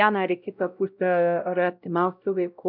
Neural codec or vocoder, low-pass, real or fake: codec, 16 kHz, 0.5 kbps, X-Codec, WavLM features, trained on Multilingual LibriSpeech; 5.4 kHz; fake